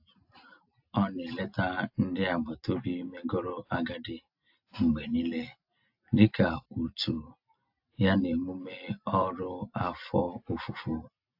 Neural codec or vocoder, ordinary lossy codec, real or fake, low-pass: none; AAC, 48 kbps; real; 5.4 kHz